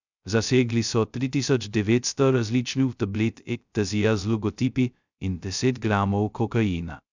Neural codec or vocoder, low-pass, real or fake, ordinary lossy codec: codec, 16 kHz, 0.2 kbps, FocalCodec; 7.2 kHz; fake; none